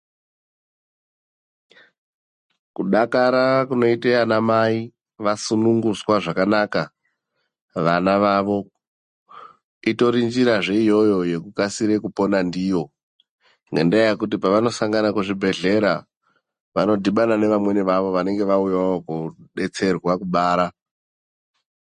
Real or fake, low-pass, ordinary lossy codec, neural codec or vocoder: real; 14.4 kHz; MP3, 48 kbps; none